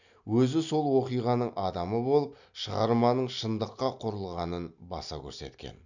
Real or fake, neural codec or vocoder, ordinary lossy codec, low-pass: real; none; none; 7.2 kHz